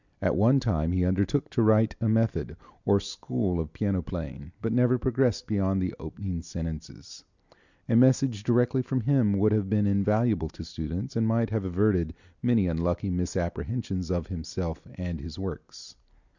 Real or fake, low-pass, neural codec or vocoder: real; 7.2 kHz; none